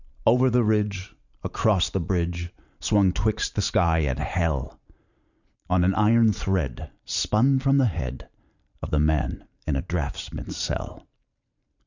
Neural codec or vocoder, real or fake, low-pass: vocoder, 44.1 kHz, 128 mel bands every 512 samples, BigVGAN v2; fake; 7.2 kHz